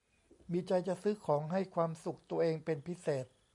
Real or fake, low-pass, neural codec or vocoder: real; 10.8 kHz; none